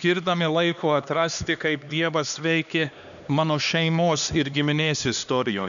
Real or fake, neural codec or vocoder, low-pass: fake; codec, 16 kHz, 2 kbps, X-Codec, HuBERT features, trained on LibriSpeech; 7.2 kHz